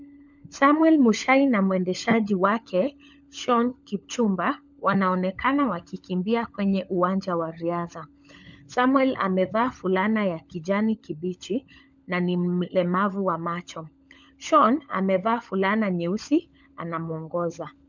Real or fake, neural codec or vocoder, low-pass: fake; codec, 16 kHz, 16 kbps, FunCodec, trained on LibriTTS, 50 frames a second; 7.2 kHz